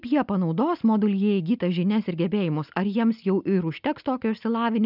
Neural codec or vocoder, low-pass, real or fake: none; 5.4 kHz; real